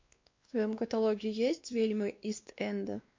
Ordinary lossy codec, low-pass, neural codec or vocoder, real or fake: MP3, 48 kbps; 7.2 kHz; codec, 16 kHz, 2 kbps, X-Codec, WavLM features, trained on Multilingual LibriSpeech; fake